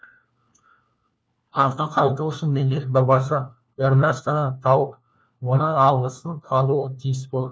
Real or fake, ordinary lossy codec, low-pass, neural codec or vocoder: fake; none; none; codec, 16 kHz, 1 kbps, FunCodec, trained on LibriTTS, 50 frames a second